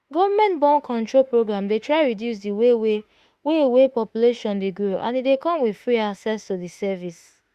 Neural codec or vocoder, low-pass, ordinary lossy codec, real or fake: autoencoder, 48 kHz, 32 numbers a frame, DAC-VAE, trained on Japanese speech; 14.4 kHz; Opus, 64 kbps; fake